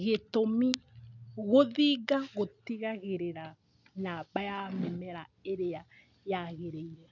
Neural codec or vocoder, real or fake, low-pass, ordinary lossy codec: none; real; 7.2 kHz; none